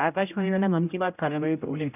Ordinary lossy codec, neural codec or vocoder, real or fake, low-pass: none; codec, 16 kHz, 0.5 kbps, X-Codec, HuBERT features, trained on general audio; fake; 3.6 kHz